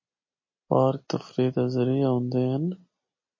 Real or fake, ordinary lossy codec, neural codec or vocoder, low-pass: real; MP3, 32 kbps; none; 7.2 kHz